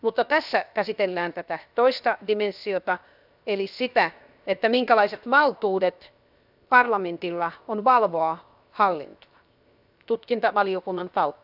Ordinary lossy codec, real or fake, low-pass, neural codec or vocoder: none; fake; 5.4 kHz; codec, 16 kHz, 0.7 kbps, FocalCodec